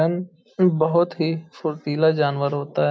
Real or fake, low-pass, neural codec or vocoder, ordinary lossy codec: real; none; none; none